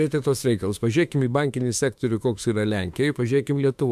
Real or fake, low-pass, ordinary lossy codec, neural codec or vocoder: fake; 14.4 kHz; MP3, 96 kbps; autoencoder, 48 kHz, 32 numbers a frame, DAC-VAE, trained on Japanese speech